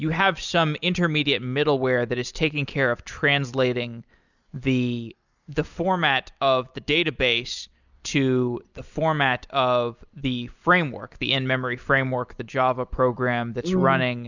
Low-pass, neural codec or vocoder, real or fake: 7.2 kHz; none; real